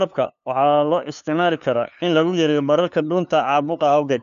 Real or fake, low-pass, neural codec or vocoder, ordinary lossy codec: fake; 7.2 kHz; codec, 16 kHz, 2 kbps, FunCodec, trained on LibriTTS, 25 frames a second; none